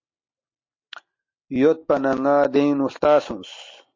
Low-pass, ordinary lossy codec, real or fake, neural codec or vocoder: 7.2 kHz; MP3, 32 kbps; real; none